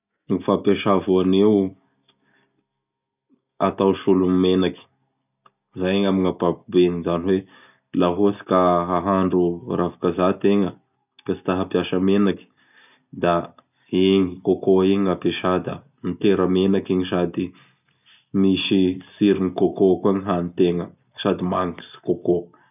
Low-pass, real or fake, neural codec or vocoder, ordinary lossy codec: 3.6 kHz; real; none; none